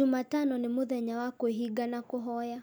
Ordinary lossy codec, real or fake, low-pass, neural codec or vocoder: none; real; none; none